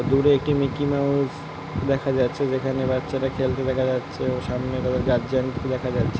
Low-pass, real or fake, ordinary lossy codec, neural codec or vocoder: none; real; none; none